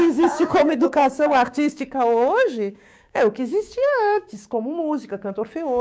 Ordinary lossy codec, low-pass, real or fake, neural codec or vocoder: none; none; fake; codec, 16 kHz, 6 kbps, DAC